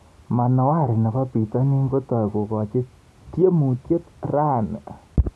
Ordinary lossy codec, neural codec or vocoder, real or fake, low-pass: none; none; real; none